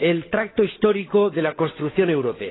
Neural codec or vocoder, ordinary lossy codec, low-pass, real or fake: codec, 16 kHz, 16 kbps, FunCodec, trained on LibriTTS, 50 frames a second; AAC, 16 kbps; 7.2 kHz; fake